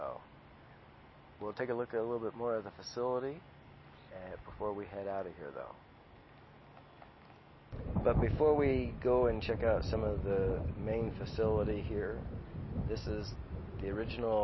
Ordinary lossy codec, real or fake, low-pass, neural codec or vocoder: MP3, 24 kbps; real; 7.2 kHz; none